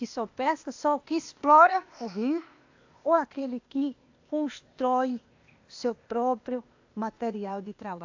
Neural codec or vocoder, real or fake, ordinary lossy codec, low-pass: codec, 16 kHz, 0.8 kbps, ZipCodec; fake; AAC, 48 kbps; 7.2 kHz